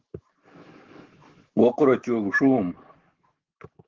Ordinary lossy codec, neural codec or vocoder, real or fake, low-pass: Opus, 16 kbps; autoencoder, 48 kHz, 128 numbers a frame, DAC-VAE, trained on Japanese speech; fake; 7.2 kHz